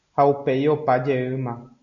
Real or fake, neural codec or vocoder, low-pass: real; none; 7.2 kHz